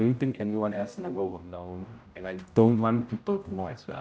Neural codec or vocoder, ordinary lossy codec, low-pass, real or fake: codec, 16 kHz, 0.5 kbps, X-Codec, HuBERT features, trained on general audio; none; none; fake